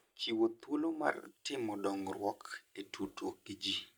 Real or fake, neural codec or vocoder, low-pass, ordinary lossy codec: real; none; none; none